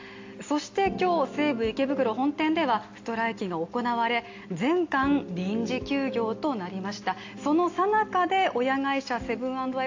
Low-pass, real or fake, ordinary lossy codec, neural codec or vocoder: 7.2 kHz; real; AAC, 48 kbps; none